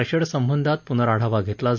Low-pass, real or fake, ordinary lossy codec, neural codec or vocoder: 7.2 kHz; real; none; none